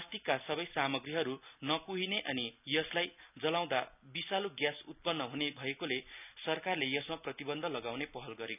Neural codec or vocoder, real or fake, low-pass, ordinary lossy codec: none; real; 3.6 kHz; none